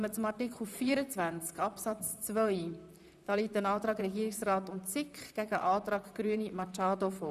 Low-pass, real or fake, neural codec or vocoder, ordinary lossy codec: 14.4 kHz; fake; vocoder, 44.1 kHz, 128 mel bands, Pupu-Vocoder; none